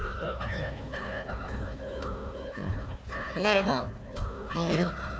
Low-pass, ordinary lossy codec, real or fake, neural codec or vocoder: none; none; fake; codec, 16 kHz, 1 kbps, FunCodec, trained on Chinese and English, 50 frames a second